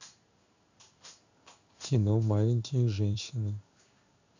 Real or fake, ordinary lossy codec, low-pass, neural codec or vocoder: fake; none; 7.2 kHz; codec, 16 kHz in and 24 kHz out, 1 kbps, XY-Tokenizer